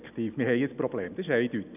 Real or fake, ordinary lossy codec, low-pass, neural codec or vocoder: real; none; 3.6 kHz; none